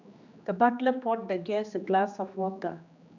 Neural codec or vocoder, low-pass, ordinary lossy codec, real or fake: codec, 16 kHz, 2 kbps, X-Codec, HuBERT features, trained on general audio; 7.2 kHz; none; fake